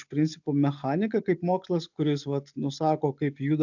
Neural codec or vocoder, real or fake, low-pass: none; real; 7.2 kHz